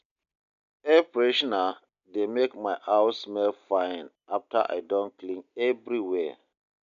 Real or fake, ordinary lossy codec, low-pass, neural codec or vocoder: real; none; 7.2 kHz; none